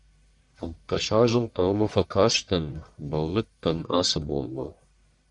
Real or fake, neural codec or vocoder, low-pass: fake; codec, 44.1 kHz, 1.7 kbps, Pupu-Codec; 10.8 kHz